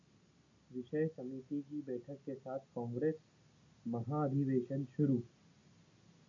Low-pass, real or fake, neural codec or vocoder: 7.2 kHz; real; none